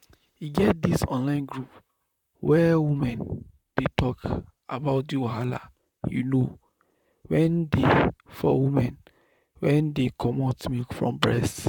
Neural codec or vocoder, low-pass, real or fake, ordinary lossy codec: vocoder, 44.1 kHz, 128 mel bands, Pupu-Vocoder; 19.8 kHz; fake; none